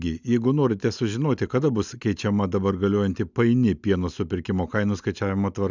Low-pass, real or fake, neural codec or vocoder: 7.2 kHz; real; none